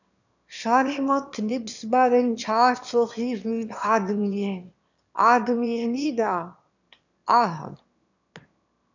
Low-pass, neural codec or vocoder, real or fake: 7.2 kHz; autoencoder, 22.05 kHz, a latent of 192 numbers a frame, VITS, trained on one speaker; fake